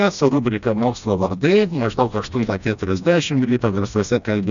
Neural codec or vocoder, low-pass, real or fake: codec, 16 kHz, 1 kbps, FreqCodec, smaller model; 7.2 kHz; fake